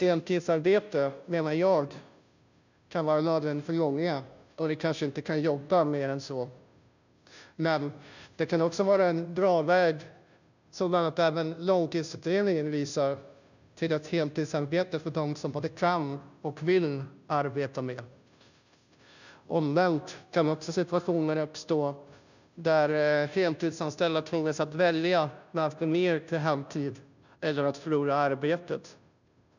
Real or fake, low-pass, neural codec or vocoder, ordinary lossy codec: fake; 7.2 kHz; codec, 16 kHz, 0.5 kbps, FunCodec, trained on Chinese and English, 25 frames a second; none